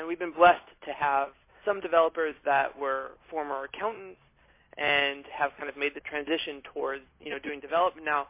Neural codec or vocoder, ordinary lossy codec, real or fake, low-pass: none; MP3, 24 kbps; real; 3.6 kHz